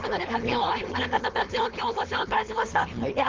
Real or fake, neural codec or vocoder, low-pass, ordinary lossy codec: fake; codec, 16 kHz, 4.8 kbps, FACodec; 7.2 kHz; Opus, 16 kbps